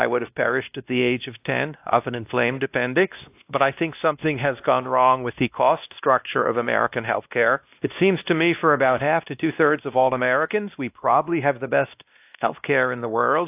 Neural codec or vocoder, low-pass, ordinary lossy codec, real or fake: codec, 16 kHz, 1 kbps, X-Codec, WavLM features, trained on Multilingual LibriSpeech; 3.6 kHz; AAC, 32 kbps; fake